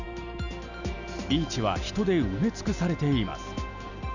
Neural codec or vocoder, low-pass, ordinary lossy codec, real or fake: none; 7.2 kHz; none; real